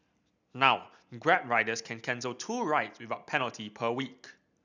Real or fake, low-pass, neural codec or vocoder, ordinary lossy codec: real; 7.2 kHz; none; none